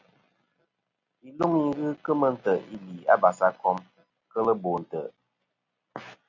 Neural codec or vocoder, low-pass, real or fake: none; 7.2 kHz; real